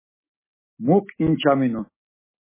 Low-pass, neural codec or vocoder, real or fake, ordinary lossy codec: 3.6 kHz; none; real; MP3, 16 kbps